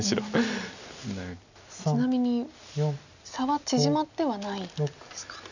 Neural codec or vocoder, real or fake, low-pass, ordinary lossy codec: none; real; 7.2 kHz; none